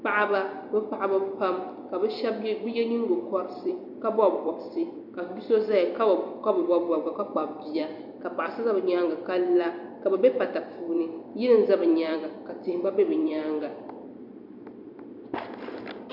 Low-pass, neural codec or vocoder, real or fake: 5.4 kHz; vocoder, 44.1 kHz, 128 mel bands every 256 samples, BigVGAN v2; fake